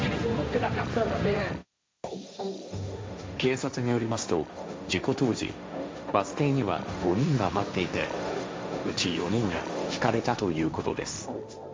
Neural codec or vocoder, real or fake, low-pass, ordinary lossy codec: codec, 16 kHz, 1.1 kbps, Voila-Tokenizer; fake; none; none